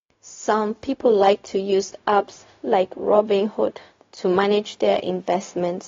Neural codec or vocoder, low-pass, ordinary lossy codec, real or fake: codec, 16 kHz, 0.4 kbps, LongCat-Audio-Codec; 7.2 kHz; AAC, 32 kbps; fake